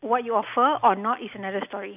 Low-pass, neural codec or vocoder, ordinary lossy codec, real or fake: 3.6 kHz; none; none; real